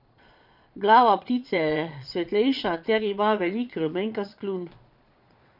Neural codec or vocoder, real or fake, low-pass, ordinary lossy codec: vocoder, 22.05 kHz, 80 mel bands, Vocos; fake; 5.4 kHz; none